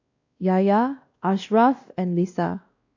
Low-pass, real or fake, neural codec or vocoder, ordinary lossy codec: 7.2 kHz; fake; codec, 16 kHz, 1 kbps, X-Codec, WavLM features, trained on Multilingual LibriSpeech; AAC, 48 kbps